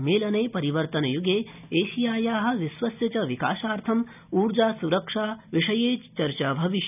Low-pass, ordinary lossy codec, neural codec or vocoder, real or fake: 3.6 kHz; none; none; real